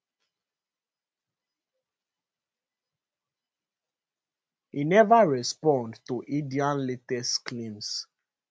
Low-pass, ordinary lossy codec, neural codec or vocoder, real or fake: none; none; none; real